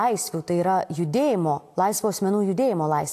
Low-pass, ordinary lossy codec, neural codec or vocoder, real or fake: 14.4 kHz; AAC, 64 kbps; none; real